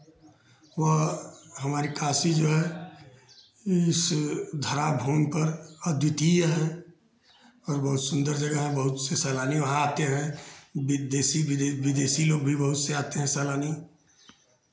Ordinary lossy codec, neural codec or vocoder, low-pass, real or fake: none; none; none; real